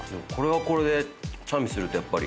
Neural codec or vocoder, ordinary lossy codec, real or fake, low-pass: none; none; real; none